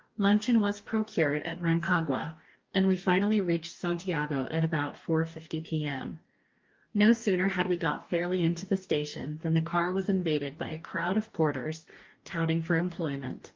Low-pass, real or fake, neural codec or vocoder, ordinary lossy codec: 7.2 kHz; fake; codec, 44.1 kHz, 2.6 kbps, DAC; Opus, 32 kbps